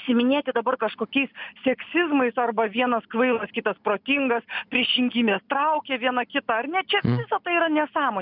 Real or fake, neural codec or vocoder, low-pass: real; none; 5.4 kHz